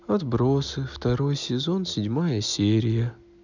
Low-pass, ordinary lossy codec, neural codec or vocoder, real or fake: 7.2 kHz; none; none; real